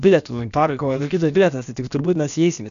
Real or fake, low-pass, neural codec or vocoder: fake; 7.2 kHz; codec, 16 kHz, about 1 kbps, DyCAST, with the encoder's durations